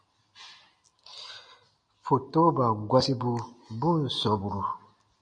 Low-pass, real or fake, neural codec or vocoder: 9.9 kHz; real; none